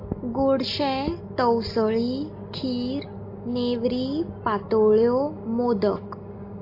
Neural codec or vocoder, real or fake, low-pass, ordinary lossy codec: none; real; 5.4 kHz; AAC, 32 kbps